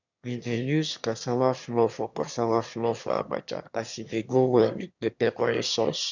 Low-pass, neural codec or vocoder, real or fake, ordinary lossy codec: 7.2 kHz; autoencoder, 22.05 kHz, a latent of 192 numbers a frame, VITS, trained on one speaker; fake; none